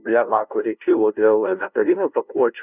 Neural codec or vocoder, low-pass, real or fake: codec, 16 kHz, 0.5 kbps, FunCodec, trained on LibriTTS, 25 frames a second; 3.6 kHz; fake